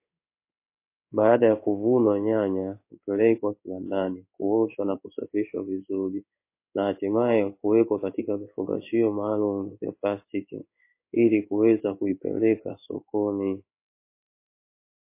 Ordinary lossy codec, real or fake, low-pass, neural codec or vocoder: MP3, 24 kbps; fake; 3.6 kHz; codec, 16 kHz in and 24 kHz out, 1 kbps, XY-Tokenizer